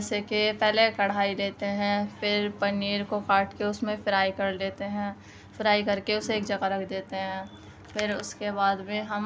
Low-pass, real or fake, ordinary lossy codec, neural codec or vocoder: none; real; none; none